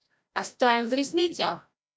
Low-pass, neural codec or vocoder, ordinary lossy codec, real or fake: none; codec, 16 kHz, 0.5 kbps, FreqCodec, larger model; none; fake